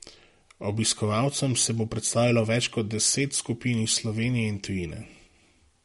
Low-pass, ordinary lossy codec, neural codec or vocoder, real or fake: 19.8 kHz; MP3, 48 kbps; none; real